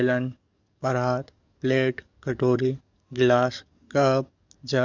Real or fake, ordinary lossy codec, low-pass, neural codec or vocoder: fake; none; 7.2 kHz; codec, 44.1 kHz, 7.8 kbps, DAC